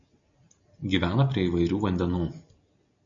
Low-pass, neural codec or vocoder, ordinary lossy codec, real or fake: 7.2 kHz; none; MP3, 96 kbps; real